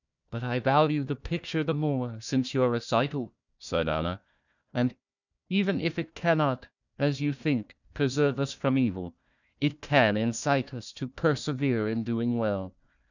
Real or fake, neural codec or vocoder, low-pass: fake; codec, 16 kHz, 1 kbps, FunCodec, trained on Chinese and English, 50 frames a second; 7.2 kHz